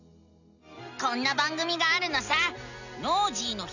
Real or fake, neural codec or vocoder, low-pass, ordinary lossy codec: real; none; 7.2 kHz; none